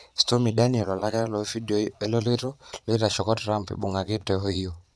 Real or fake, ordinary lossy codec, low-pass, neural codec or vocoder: fake; none; none; vocoder, 22.05 kHz, 80 mel bands, Vocos